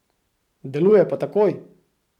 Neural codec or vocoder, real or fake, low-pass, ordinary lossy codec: vocoder, 44.1 kHz, 128 mel bands every 512 samples, BigVGAN v2; fake; 19.8 kHz; none